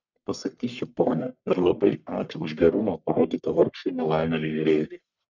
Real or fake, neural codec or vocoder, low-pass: fake; codec, 44.1 kHz, 1.7 kbps, Pupu-Codec; 7.2 kHz